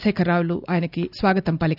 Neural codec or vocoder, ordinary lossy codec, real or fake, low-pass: none; none; real; 5.4 kHz